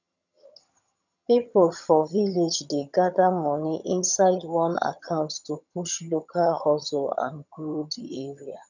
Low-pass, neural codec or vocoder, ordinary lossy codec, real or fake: 7.2 kHz; vocoder, 22.05 kHz, 80 mel bands, HiFi-GAN; none; fake